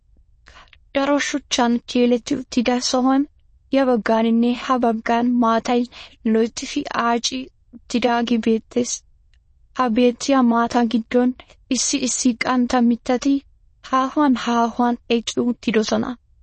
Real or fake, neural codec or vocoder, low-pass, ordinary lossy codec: fake; autoencoder, 22.05 kHz, a latent of 192 numbers a frame, VITS, trained on many speakers; 9.9 kHz; MP3, 32 kbps